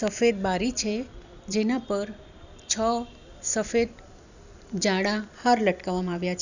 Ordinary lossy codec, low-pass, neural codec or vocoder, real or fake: none; 7.2 kHz; vocoder, 44.1 kHz, 128 mel bands every 256 samples, BigVGAN v2; fake